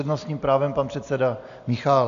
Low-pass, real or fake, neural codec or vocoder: 7.2 kHz; real; none